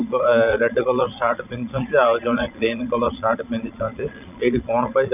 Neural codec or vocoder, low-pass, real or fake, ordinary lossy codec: vocoder, 22.05 kHz, 80 mel bands, Vocos; 3.6 kHz; fake; none